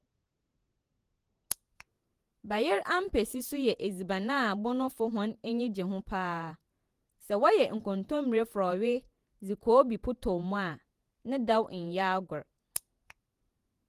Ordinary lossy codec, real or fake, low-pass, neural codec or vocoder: Opus, 24 kbps; fake; 14.4 kHz; vocoder, 48 kHz, 128 mel bands, Vocos